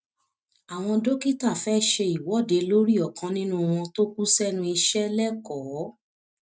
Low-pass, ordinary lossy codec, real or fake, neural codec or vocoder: none; none; real; none